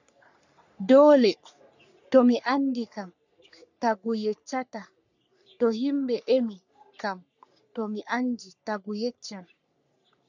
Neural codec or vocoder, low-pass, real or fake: codec, 44.1 kHz, 3.4 kbps, Pupu-Codec; 7.2 kHz; fake